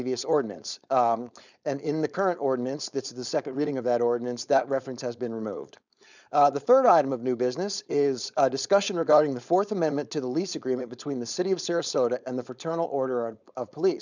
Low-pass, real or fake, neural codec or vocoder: 7.2 kHz; fake; codec, 16 kHz, 4.8 kbps, FACodec